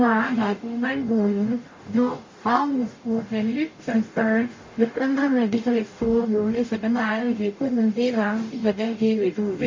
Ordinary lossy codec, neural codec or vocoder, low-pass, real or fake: MP3, 32 kbps; codec, 44.1 kHz, 0.9 kbps, DAC; 7.2 kHz; fake